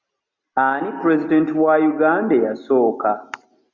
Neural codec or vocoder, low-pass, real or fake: none; 7.2 kHz; real